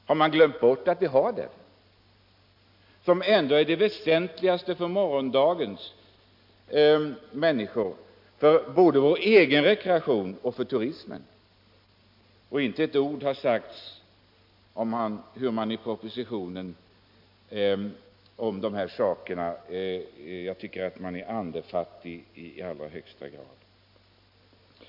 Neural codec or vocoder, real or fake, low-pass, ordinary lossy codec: none; real; 5.4 kHz; MP3, 48 kbps